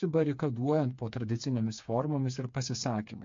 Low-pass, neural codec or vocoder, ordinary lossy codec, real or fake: 7.2 kHz; codec, 16 kHz, 4 kbps, FreqCodec, smaller model; MP3, 48 kbps; fake